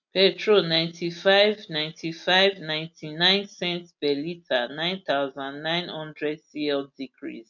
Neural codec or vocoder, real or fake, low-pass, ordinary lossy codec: none; real; 7.2 kHz; none